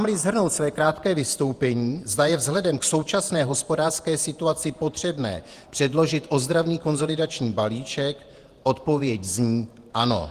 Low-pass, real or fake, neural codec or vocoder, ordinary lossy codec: 14.4 kHz; fake; vocoder, 48 kHz, 128 mel bands, Vocos; Opus, 24 kbps